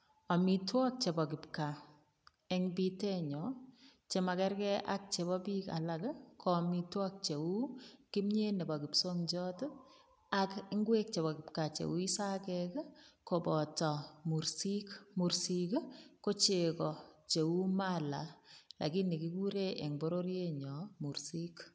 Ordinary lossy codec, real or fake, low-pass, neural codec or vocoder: none; real; none; none